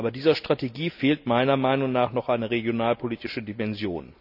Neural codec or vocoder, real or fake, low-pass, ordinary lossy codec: none; real; 5.4 kHz; none